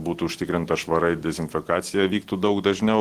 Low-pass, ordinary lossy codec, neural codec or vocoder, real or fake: 14.4 kHz; Opus, 16 kbps; none; real